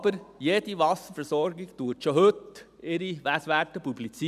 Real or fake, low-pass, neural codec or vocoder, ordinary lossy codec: real; 14.4 kHz; none; none